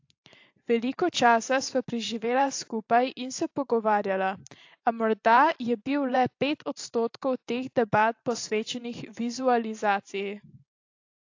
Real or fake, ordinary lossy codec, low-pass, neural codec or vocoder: fake; AAC, 48 kbps; 7.2 kHz; vocoder, 44.1 kHz, 128 mel bands every 512 samples, BigVGAN v2